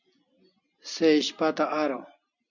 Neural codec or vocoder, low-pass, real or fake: none; 7.2 kHz; real